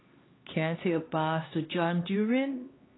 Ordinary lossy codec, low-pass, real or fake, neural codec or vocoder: AAC, 16 kbps; 7.2 kHz; fake; codec, 16 kHz, 2 kbps, X-Codec, HuBERT features, trained on LibriSpeech